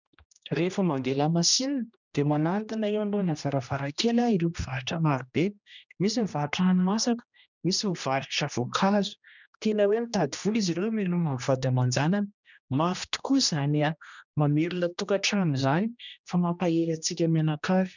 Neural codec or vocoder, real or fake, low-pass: codec, 16 kHz, 1 kbps, X-Codec, HuBERT features, trained on general audio; fake; 7.2 kHz